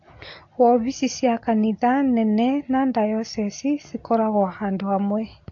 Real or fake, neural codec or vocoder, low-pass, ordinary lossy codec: real; none; 7.2 kHz; AAC, 48 kbps